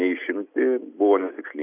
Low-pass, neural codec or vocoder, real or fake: 3.6 kHz; none; real